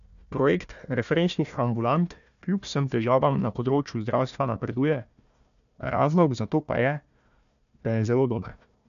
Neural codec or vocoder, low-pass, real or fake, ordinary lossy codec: codec, 16 kHz, 1 kbps, FunCodec, trained on Chinese and English, 50 frames a second; 7.2 kHz; fake; none